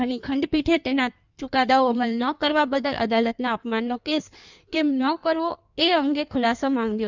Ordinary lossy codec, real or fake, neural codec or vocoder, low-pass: none; fake; codec, 16 kHz in and 24 kHz out, 1.1 kbps, FireRedTTS-2 codec; 7.2 kHz